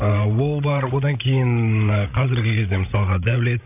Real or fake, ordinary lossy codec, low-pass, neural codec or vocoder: fake; none; 3.6 kHz; codec, 16 kHz, 16 kbps, FreqCodec, larger model